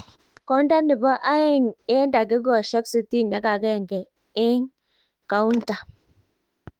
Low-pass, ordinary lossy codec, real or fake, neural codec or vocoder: 19.8 kHz; Opus, 24 kbps; fake; autoencoder, 48 kHz, 32 numbers a frame, DAC-VAE, trained on Japanese speech